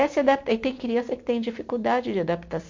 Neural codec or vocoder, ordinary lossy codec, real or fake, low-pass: none; MP3, 48 kbps; real; 7.2 kHz